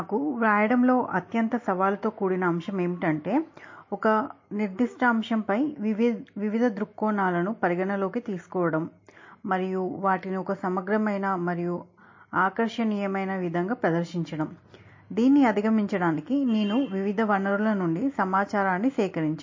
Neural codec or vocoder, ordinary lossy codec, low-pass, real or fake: none; MP3, 32 kbps; 7.2 kHz; real